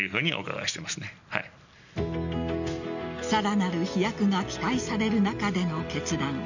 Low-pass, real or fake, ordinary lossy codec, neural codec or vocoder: 7.2 kHz; real; none; none